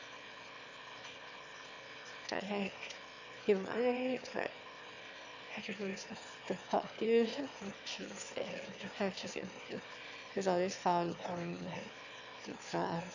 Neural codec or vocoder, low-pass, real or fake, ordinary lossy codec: autoencoder, 22.05 kHz, a latent of 192 numbers a frame, VITS, trained on one speaker; 7.2 kHz; fake; AAC, 48 kbps